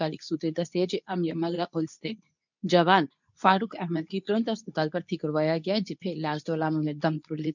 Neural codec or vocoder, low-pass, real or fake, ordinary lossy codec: codec, 24 kHz, 0.9 kbps, WavTokenizer, medium speech release version 2; 7.2 kHz; fake; none